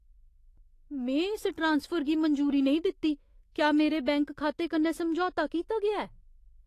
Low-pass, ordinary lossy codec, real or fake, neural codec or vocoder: 14.4 kHz; AAC, 48 kbps; fake; autoencoder, 48 kHz, 128 numbers a frame, DAC-VAE, trained on Japanese speech